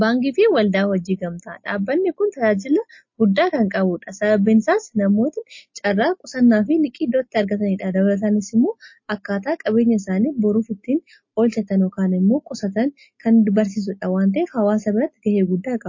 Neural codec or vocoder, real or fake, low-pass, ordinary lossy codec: none; real; 7.2 kHz; MP3, 32 kbps